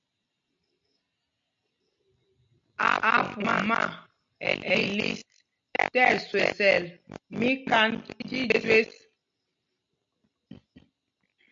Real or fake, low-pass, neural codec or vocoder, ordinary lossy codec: real; 7.2 kHz; none; MP3, 64 kbps